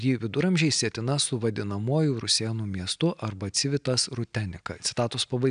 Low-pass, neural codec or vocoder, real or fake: 9.9 kHz; none; real